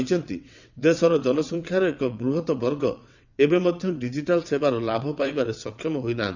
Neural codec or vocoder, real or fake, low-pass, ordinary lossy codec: vocoder, 22.05 kHz, 80 mel bands, WaveNeXt; fake; 7.2 kHz; none